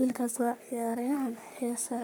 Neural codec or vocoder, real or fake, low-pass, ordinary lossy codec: codec, 44.1 kHz, 3.4 kbps, Pupu-Codec; fake; none; none